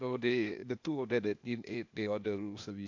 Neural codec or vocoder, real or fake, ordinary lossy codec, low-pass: codec, 16 kHz, 0.8 kbps, ZipCodec; fake; MP3, 64 kbps; 7.2 kHz